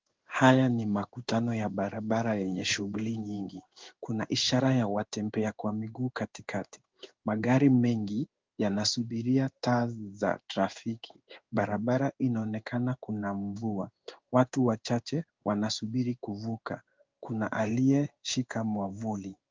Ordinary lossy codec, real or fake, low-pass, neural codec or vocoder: Opus, 32 kbps; fake; 7.2 kHz; codec, 16 kHz in and 24 kHz out, 1 kbps, XY-Tokenizer